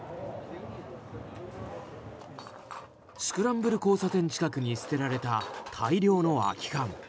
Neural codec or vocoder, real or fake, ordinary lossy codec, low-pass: none; real; none; none